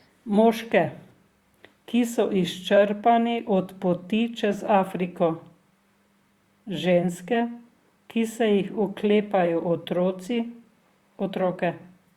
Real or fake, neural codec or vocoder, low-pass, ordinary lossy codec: fake; vocoder, 44.1 kHz, 128 mel bands, Pupu-Vocoder; 19.8 kHz; Opus, 64 kbps